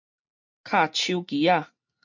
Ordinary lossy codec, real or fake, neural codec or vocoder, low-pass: MP3, 64 kbps; real; none; 7.2 kHz